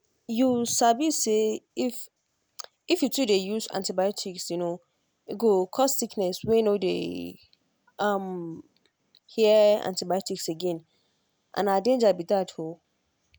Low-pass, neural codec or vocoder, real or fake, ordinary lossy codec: none; none; real; none